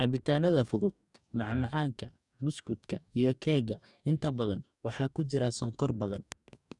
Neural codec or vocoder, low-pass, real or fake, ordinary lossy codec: codec, 44.1 kHz, 2.6 kbps, DAC; 10.8 kHz; fake; none